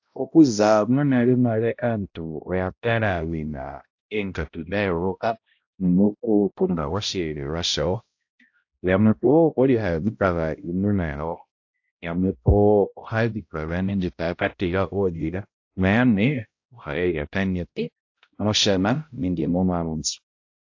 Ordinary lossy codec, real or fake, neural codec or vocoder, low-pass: AAC, 48 kbps; fake; codec, 16 kHz, 0.5 kbps, X-Codec, HuBERT features, trained on balanced general audio; 7.2 kHz